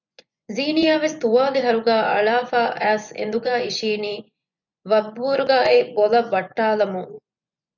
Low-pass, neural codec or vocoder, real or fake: 7.2 kHz; vocoder, 24 kHz, 100 mel bands, Vocos; fake